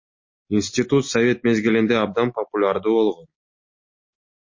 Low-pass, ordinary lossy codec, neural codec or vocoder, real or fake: 7.2 kHz; MP3, 32 kbps; none; real